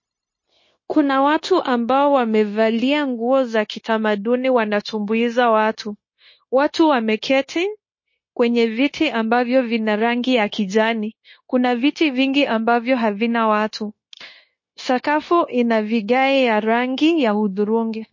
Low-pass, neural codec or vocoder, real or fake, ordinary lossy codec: 7.2 kHz; codec, 16 kHz, 0.9 kbps, LongCat-Audio-Codec; fake; MP3, 32 kbps